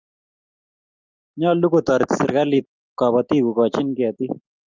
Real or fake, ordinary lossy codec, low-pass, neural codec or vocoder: real; Opus, 24 kbps; 7.2 kHz; none